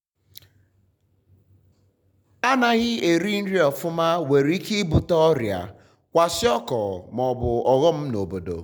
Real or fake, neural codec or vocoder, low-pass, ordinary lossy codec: real; none; 19.8 kHz; none